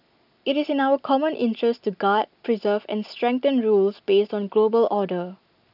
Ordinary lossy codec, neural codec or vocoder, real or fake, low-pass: none; none; real; 5.4 kHz